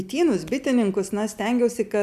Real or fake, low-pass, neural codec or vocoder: real; 14.4 kHz; none